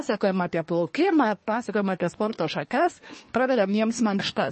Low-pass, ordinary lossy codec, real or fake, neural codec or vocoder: 10.8 kHz; MP3, 32 kbps; fake; codec, 24 kHz, 1 kbps, SNAC